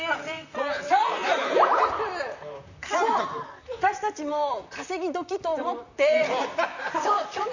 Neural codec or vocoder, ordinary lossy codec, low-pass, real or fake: vocoder, 44.1 kHz, 128 mel bands, Pupu-Vocoder; none; 7.2 kHz; fake